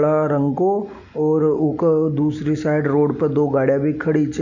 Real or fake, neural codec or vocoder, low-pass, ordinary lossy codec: real; none; 7.2 kHz; none